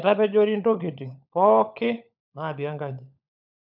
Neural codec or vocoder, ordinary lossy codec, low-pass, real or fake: codec, 16 kHz, 16 kbps, FunCodec, trained on LibriTTS, 50 frames a second; none; 5.4 kHz; fake